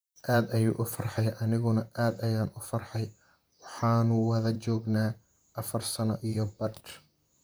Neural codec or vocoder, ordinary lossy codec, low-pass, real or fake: vocoder, 44.1 kHz, 128 mel bands, Pupu-Vocoder; none; none; fake